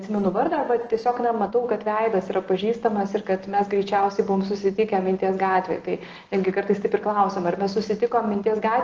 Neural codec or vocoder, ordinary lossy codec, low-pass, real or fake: none; Opus, 16 kbps; 7.2 kHz; real